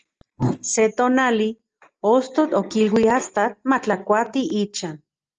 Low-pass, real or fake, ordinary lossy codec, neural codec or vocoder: 7.2 kHz; real; Opus, 24 kbps; none